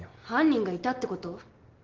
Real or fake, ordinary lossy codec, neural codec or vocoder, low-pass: real; Opus, 16 kbps; none; 7.2 kHz